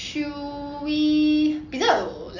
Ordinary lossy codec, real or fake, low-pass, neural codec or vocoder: none; real; 7.2 kHz; none